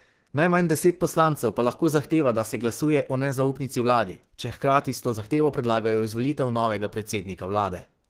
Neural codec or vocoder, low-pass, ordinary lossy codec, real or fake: codec, 32 kHz, 1.9 kbps, SNAC; 14.4 kHz; Opus, 16 kbps; fake